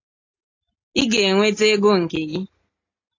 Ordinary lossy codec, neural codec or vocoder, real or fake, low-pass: AAC, 32 kbps; none; real; 7.2 kHz